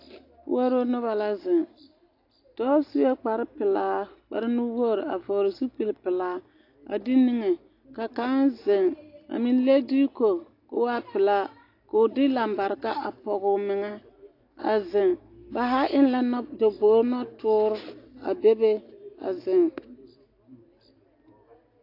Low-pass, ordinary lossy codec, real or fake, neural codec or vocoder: 5.4 kHz; AAC, 32 kbps; real; none